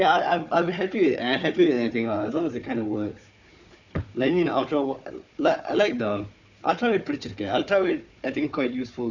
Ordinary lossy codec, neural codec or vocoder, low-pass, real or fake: none; codec, 16 kHz, 4 kbps, FunCodec, trained on Chinese and English, 50 frames a second; 7.2 kHz; fake